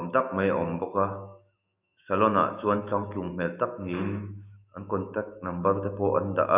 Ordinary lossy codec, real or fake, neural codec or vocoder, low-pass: none; fake; autoencoder, 48 kHz, 128 numbers a frame, DAC-VAE, trained on Japanese speech; 3.6 kHz